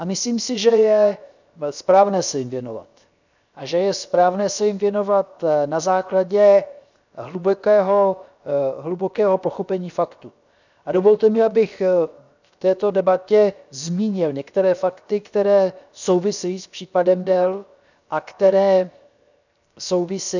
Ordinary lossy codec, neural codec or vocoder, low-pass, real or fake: none; codec, 16 kHz, 0.7 kbps, FocalCodec; 7.2 kHz; fake